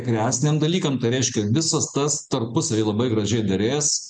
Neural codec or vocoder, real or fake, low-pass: none; real; 9.9 kHz